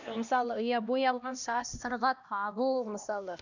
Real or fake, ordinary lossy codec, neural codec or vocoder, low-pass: fake; none; codec, 16 kHz, 1 kbps, X-Codec, HuBERT features, trained on LibriSpeech; 7.2 kHz